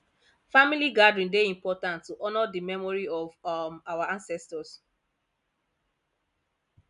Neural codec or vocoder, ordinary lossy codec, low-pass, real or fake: none; none; 10.8 kHz; real